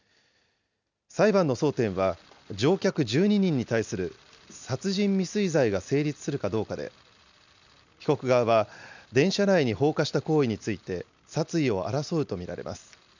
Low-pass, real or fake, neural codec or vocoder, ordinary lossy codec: 7.2 kHz; real; none; none